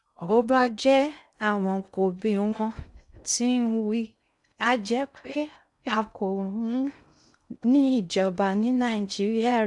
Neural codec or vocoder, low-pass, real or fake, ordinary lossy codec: codec, 16 kHz in and 24 kHz out, 0.6 kbps, FocalCodec, streaming, 2048 codes; 10.8 kHz; fake; none